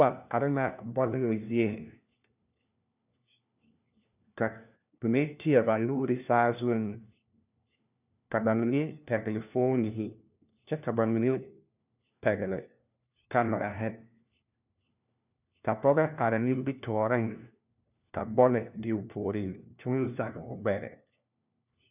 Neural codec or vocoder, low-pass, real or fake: codec, 16 kHz, 1 kbps, FunCodec, trained on LibriTTS, 50 frames a second; 3.6 kHz; fake